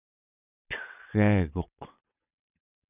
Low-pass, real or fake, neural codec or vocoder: 3.6 kHz; fake; vocoder, 22.05 kHz, 80 mel bands, WaveNeXt